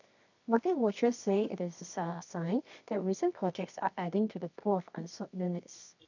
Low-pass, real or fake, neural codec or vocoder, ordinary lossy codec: 7.2 kHz; fake; codec, 24 kHz, 0.9 kbps, WavTokenizer, medium music audio release; none